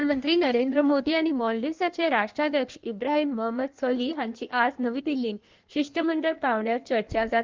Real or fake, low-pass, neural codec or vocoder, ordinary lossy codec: fake; 7.2 kHz; codec, 16 kHz in and 24 kHz out, 1.1 kbps, FireRedTTS-2 codec; Opus, 32 kbps